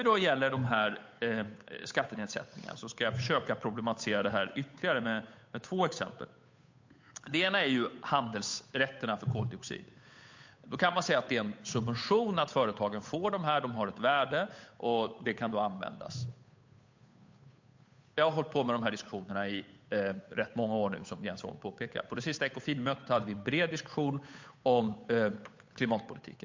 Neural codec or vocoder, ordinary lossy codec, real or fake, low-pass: codec, 16 kHz, 8 kbps, FunCodec, trained on Chinese and English, 25 frames a second; MP3, 48 kbps; fake; 7.2 kHz